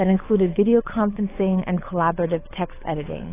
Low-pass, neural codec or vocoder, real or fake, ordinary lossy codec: 3.6 kHz; codec, 24 kHz, 6 kbps, HILCodec; fake; AAC, 16 kbps